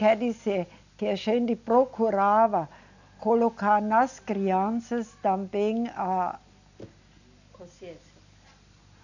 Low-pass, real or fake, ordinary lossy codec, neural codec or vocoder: 7.2 kHz; real; none; none